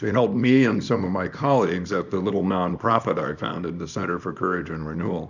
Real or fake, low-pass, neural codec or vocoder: fake; 7.2 kHz; codec, 24 kHz, 0.9 kbps, WavTokenizer, small release